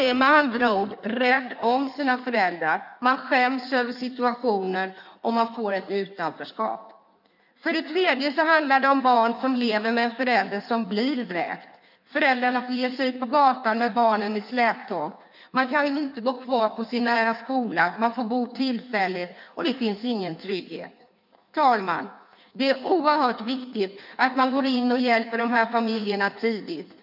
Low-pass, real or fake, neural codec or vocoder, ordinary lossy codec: 5.4 kHz; fake; codec, 16 kHz in and 24 kHz out, 1.1 kbps, FireRedTTS-2 codec; none